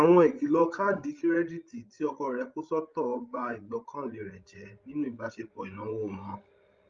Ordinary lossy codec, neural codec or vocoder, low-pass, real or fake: Opus, 32 kbps; codec, 16 kHz, 16 kbps, FreqCodec, larger model; 7.2 kHz; fake